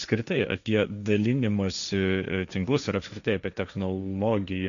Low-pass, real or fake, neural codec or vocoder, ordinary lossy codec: 7.2 kHz; fake; codec, 16 kHz, 1.1 kbps, Voila-Tokenizer; MP3, 96 kbps